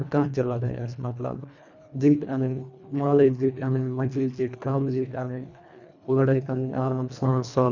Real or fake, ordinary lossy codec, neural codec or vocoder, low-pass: fake; none; codec, 24 kHz, 1.5 kbps, HILCodec; 7.2 kHz